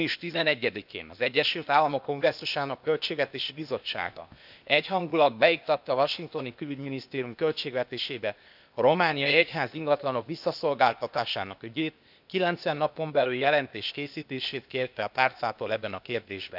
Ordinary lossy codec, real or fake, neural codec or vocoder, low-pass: none; fake; codec, 16 kHz, 0.8 kbps, ZipCodec; 5.4 kHz